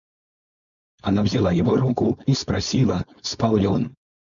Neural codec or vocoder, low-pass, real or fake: codec, 16 kHz, 4.8 kbps, FACodec; 7.2 kHz; fake